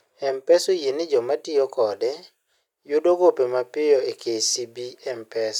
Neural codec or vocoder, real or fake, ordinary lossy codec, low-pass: none; real; none; 19.8 kHz